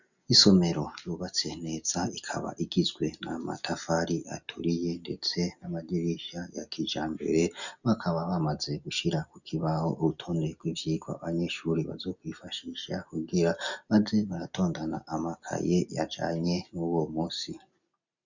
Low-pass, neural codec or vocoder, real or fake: 7.2 kHz; none; real